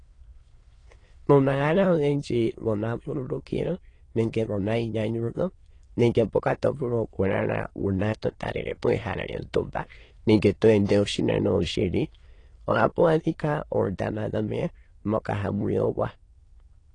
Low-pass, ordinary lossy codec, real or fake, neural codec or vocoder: 9.9 kHz; AAC, 32 kbps; fake; autoencoder, 22.05 kHz, a latent of 192 numbers a frame, VITS, trained on many speakers